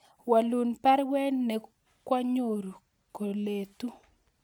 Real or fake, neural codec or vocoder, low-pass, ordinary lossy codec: real; none; none; none